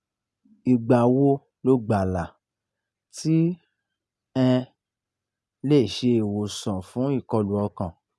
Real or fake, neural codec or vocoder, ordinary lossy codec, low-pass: real; none; none; none